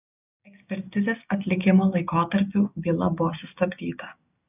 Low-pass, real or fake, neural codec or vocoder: 3.6 kHz; real; none